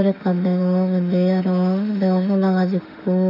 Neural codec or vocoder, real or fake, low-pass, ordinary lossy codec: autoencoder, 48 kHz, 32 numbers a frame, DAC-VAE, trained on Japanese speech; fake; 5.4 kHz; none